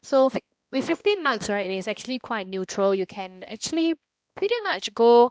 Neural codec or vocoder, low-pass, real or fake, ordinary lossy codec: codec, 16 kHz, 1 kbps, X-Codec, HuBERT features, trained on balanced general audio; none; fake; none